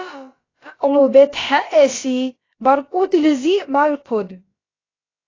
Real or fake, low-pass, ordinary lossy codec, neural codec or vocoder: fake; 7.2 kHz; AAC, 32 kbps; codec, 16 kHz, about 1 kbps, DyCAST, with the encoder's durations